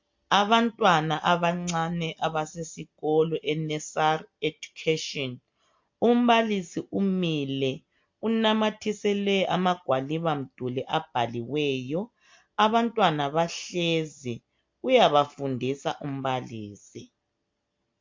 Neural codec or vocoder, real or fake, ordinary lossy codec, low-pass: none; real; MP3, 48 kbps; 7.2 kHz